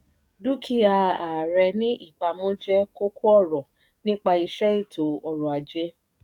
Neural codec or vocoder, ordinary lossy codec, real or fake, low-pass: codec, 44.1 kHz, 7.8 kbps, DAC; none; fake; 19.8 kHz